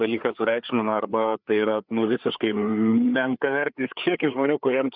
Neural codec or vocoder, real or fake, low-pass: codec, 16 kHz, 4 kbps, FreqCodec, larger model; fake; 5.4 kHz